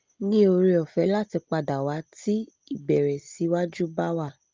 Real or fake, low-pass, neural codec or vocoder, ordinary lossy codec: real; 7.2 kHz; none; Opus, 24 kbps